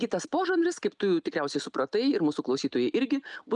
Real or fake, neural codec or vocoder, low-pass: real; none; 10.8 kHz